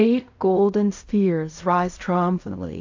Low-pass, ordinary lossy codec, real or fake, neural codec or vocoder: 7.2 kHz; AAC, 48 kbps; fake; codec, 16 kHz in and 24 kHz out, 0.4 kbps, LongCat-Audio-Codec, fine tuned four codebook decoder